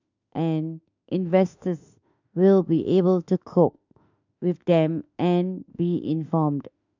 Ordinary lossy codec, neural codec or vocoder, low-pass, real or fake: none; autoencoder, 48 kHz, 32 numbers a frame, DAC-VAE, trained on Japanese speech; 7.2 kHz; fake